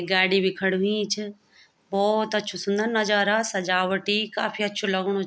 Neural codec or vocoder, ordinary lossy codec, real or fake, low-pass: none; none; real; none